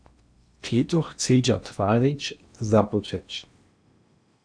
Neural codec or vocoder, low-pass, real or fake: codec, 16 kHz in and 24 kHz out, 0.8 kbps, FocalCodec, streaming, 65536 codes; 9.9 kHz; fake